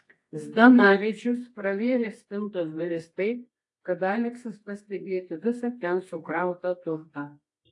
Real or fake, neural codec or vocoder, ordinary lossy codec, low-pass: fake; codec, 24 kHz, 0.9 kbps, WavTokenizer, medium music audio release; AAC, 48 kbps; 10.8 kHz